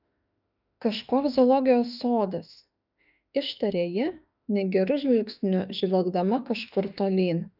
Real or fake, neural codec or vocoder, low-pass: fake; autoencoder, 48 kHz, 32 numbers a frame, DAC-VAE, trained on Japanese speech; 5.4 kHz